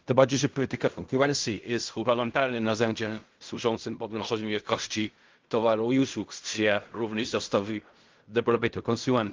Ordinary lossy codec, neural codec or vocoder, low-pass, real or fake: Opus, 24 kbps; codec, 16 kHz in and 24 kHz out, 0.4 kbps, LongCat-Audio-Codec, fine tuned four codebook decoder; 7.2 kHz; fake